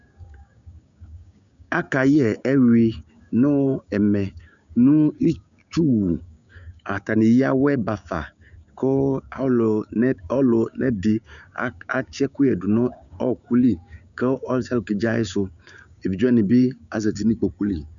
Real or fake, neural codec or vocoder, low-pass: fake; codec, 16 kHz, 6 kbps, DAC; 7.2 kHz